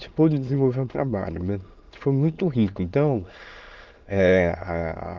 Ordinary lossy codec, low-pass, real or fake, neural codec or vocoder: Opus, 32 kbps; 7.2 kHz; fake; autoencoder, 22.05 kHz, a latent of 192 numbers a frame, VITS, trained on many speakers